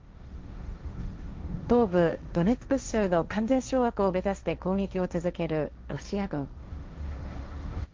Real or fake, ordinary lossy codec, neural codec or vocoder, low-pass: fake; Opus, 24 kbps; codec, 16 kHz, 1.1 kbps, Voila-Tokenizer; 7.2 kHz